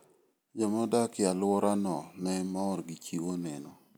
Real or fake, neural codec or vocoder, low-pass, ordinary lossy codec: real; none; none; none